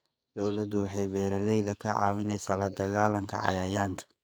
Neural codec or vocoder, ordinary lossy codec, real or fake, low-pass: codec, 44.1 kHz, 2.6 kbps, SNAC; none; fake; none